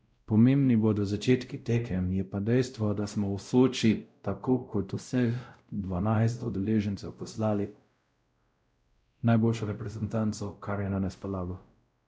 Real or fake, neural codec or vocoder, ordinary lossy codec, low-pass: fake; codec, 16 kHz, 0.5 kbps, X-Codec, WavLM features, trained on Multilingual LibriSpeech; none; none